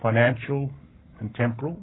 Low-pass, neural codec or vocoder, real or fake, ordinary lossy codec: 7.2 kHz; codec, 44.1 kHz, 7.8 kbps, Pupu-Codec; fake; AAC, 16 kbps